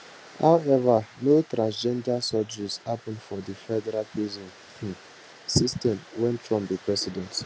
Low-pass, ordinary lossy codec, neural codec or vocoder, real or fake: none; none; none; real